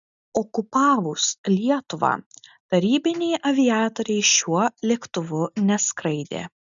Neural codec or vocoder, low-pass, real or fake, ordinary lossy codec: none; 7.2 kHz; real; MP3, 96 kbps